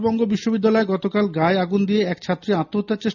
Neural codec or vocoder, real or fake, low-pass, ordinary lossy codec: none; real; 7.2 kHz; none